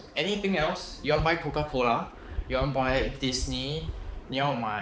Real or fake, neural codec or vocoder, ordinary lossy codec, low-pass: fake; codec, 16 kHz, 4 kbps, X-Codec, HuBERT features, trained on balanced general audio; none; none